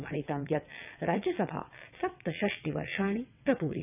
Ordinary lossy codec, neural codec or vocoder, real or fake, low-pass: none; vocoder, 22.05 kHz, 80 mel bands, WaveNeXt; fake; 3.6 kHz